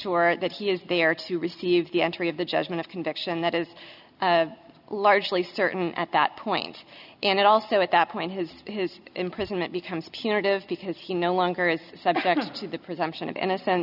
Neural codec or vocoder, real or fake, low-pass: none; real; 5.4 kHz